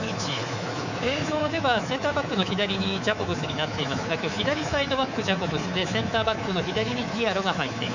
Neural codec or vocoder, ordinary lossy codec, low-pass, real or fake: codec, 24 kHz, 3.1 kbps, DualCodec; none; 7.2 kHz; fake